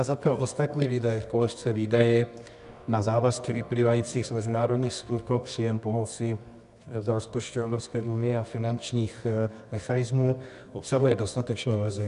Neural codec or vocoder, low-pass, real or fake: codec, 24 kHz, 0.9 kbps, WavTokenizer, medium music audio release; 10.8 kHz; fake